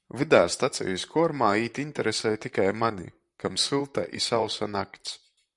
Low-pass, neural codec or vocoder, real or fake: 10.8 kHz; vocoder, 44.1 kHz, 128 mel bands, Pupu-Vocoder; fake